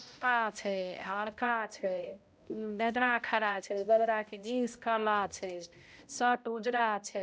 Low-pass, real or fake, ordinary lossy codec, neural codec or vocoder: none; fake; none; codec, 16 kHz, 0.5 kbps, X-Codec, HuBERT features, trained on balanced general audio